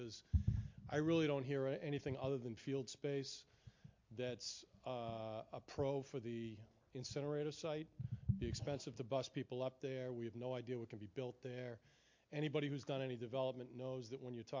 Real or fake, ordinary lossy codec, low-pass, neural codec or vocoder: real; MP3, 48 kbps; 7.2 kHz; none